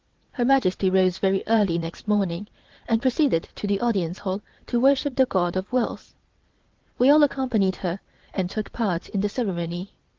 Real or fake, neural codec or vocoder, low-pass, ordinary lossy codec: fake; vocoder, 22.05 kHz, 80 mel bands, WaveNeXt; 7.2 kHz; Opus, 16 kbps